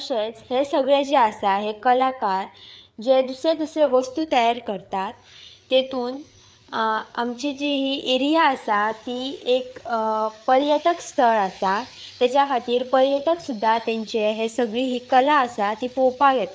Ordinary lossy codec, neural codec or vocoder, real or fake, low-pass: none; codec, 16 kHz, 4 kbps, FreqCodec, larger model; fake; none